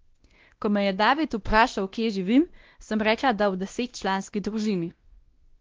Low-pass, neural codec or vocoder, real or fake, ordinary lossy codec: 7.2 kHz; codec, 16 kHz, 1 kbps, X-Codec, WavLM features, trained on Multilingual LibriSpeech; fake; Opus, 16 kbps